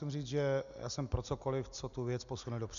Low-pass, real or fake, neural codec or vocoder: 7.2 kHz; real; none